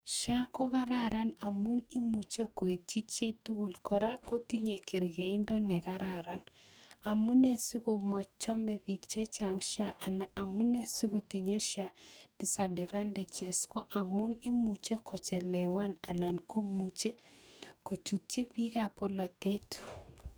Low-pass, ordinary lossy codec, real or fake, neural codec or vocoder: none; none; fake; codec, 44.1 kHz, 2.6 kbps, DAC